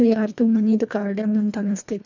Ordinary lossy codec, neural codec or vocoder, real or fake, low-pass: none; codec, 24 kHz, 1.5 kbps, HILCodec; fake; 7.2 kHz